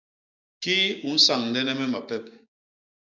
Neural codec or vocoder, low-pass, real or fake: codec, 16 kHz, 6 kbps, DAC; 7.2 kHz; fake